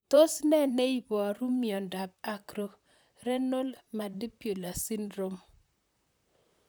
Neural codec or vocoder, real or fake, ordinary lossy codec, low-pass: vocoder, 44.1 kHz, 128 mel bands, Pupu-Vocoder; fake; none; none